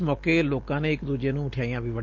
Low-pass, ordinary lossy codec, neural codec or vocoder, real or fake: 7.2 kHz; Opus, 24 kbps; none; real